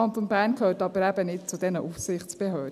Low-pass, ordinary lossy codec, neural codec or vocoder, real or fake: 14.4 kHz; none; none; real